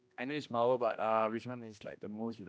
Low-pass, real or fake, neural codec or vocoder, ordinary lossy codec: none; fake; codec, 16 kHz, 1 kbps, X-Codec, HuBERT features, trained on general audio; none